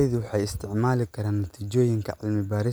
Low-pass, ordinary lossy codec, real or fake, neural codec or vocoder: none; none; real; none